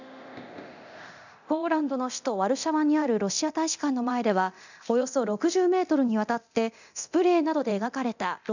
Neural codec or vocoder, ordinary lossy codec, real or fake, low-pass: codec, 24 kHz, 0.9 kbps, DualCodec; none; fake; 7.2 kHz